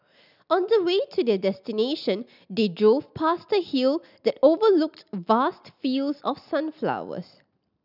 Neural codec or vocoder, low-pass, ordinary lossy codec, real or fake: none; 5.4 kHz; none; real